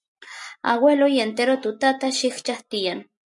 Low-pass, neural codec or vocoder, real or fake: 10.8 kHz; none; real